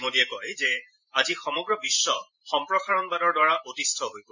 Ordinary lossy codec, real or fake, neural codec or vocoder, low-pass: none; fake; vocoder, 44.1 kHz, 128 mel bands every 512 samples, BigVGAN v2; 7.2 kHz